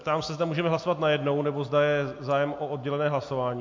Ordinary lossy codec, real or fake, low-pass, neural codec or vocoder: MP3, 48 kbps; real; 7.2 kHz; none